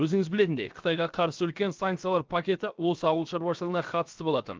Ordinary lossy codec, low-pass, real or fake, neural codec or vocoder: Opus, 32 kbps; 7.2 kHz; fake; codec, 16 kHz, 0.7 kbps, FocalCodec